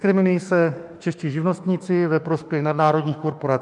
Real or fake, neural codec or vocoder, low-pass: fake; autoencoder, 48 kHz, 32 numbers a frame, DAC-VAE, trained on Japanese speech; 10.8 kHz